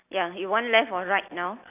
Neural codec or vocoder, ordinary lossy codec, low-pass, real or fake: none; none; 3.6 kHz; real